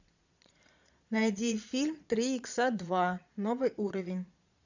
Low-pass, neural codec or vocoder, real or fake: 7.2 kHz; vocoder, 22.05 kHz, 80 mel bands, Vocos; fake